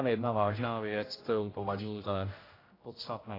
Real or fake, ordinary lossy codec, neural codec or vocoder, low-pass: fake; AAC, 24 kbps; codec, 16 kHz, 0.5 kbps, X-Codec, HuBERT features, trained on general audio; 5.4 kHz